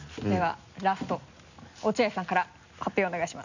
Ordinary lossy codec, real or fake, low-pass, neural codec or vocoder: none; real; 7.2 kHz; none